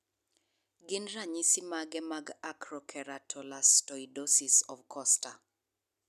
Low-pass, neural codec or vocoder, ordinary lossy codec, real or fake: 14.4 kHz; none; none; real